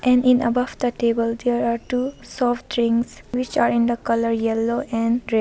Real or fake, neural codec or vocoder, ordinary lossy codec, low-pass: real; none; none; none